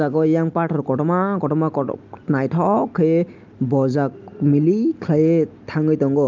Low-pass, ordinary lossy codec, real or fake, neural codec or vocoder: 7.2 kHz; Opus, 32 kbps; real; none